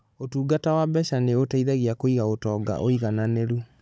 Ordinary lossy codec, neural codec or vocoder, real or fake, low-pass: none; codec, 16 kHz, 16 kbps, FunCodec, trained on Chinese and English, 50 frames a second; fake; none